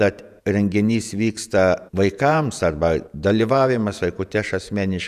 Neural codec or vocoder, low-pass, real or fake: vocoder, 48 kHz, 128 mel bands, Vocos; 14.4 kHz; fake